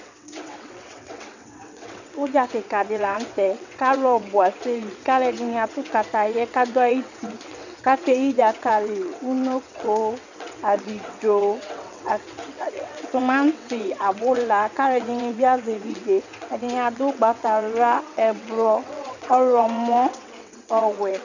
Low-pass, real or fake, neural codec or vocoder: 7.2 kHz; fake; vocoder, 22.05 kHz, 80 mel bands, WaveNeXt